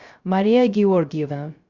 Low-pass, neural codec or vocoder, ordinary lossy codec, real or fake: 7.2 kHz; codec, 16 kHz, 0.3 kbps, FocalCodec; Opus, 64 kbps; fake